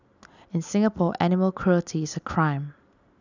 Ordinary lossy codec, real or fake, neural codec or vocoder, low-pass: none; real; none; 7.2 kHz